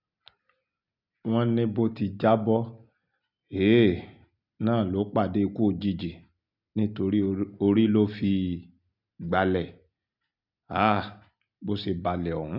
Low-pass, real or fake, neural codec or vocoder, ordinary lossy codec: 5.4 kHz; real; none; none